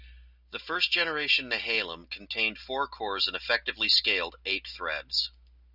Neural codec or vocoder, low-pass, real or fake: none; 5.4 kHz; real